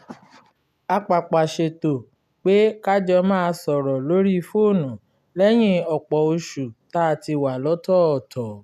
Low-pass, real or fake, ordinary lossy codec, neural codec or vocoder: 14.4 kHz; real; none; none